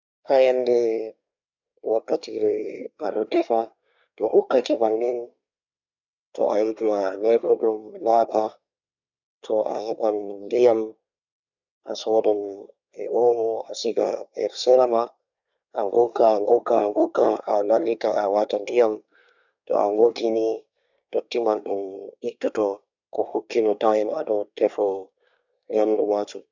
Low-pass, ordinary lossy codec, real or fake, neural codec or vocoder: 7.2 kHz; none; fake; codec, 24 kHz, 1 kbps, SNAC